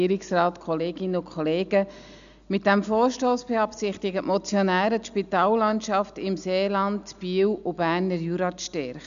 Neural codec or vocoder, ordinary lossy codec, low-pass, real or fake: none; none; 7.2 kHz; real